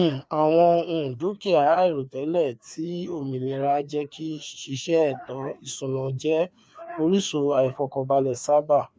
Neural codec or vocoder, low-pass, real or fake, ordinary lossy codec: codec, 16 kHz, 2 kbps, FreqCodec, larger model; none; fake; none